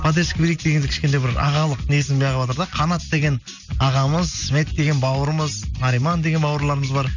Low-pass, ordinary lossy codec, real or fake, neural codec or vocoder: 7.2 kHz; none; real; none